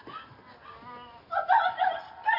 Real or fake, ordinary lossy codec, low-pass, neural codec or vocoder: real; none; 5.4 kHz; none